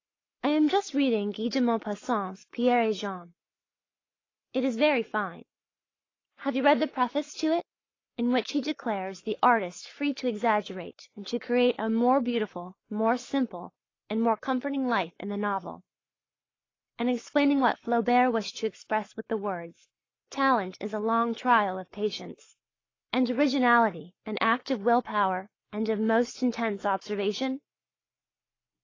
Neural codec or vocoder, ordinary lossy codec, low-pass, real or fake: codec, 44.1 kHz, 7.8 kbps, Pupu-Codec; AAC, 32 kbps; 7.2 kHz; fake